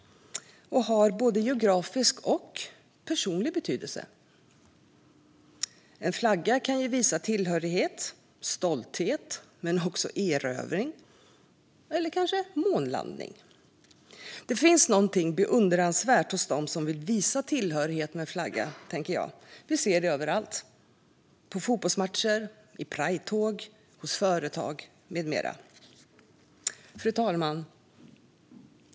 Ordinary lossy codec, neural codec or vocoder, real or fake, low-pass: none; none; real; none